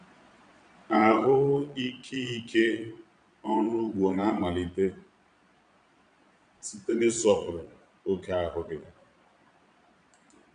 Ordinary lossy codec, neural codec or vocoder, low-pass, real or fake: none; vocoder, 22.05 kHz, 80 mel bands, Vocos; 9.9 kHz; fake